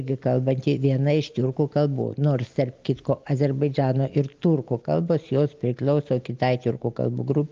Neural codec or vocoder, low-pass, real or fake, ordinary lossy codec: none; 7.2 kHz; real; Opus, 32 kbps